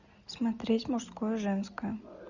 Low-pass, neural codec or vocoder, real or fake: 7.2 kHz; none; real